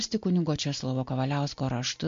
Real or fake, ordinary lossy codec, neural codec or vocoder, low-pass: real; MP3, 48 kbps; none; 7.2 kHz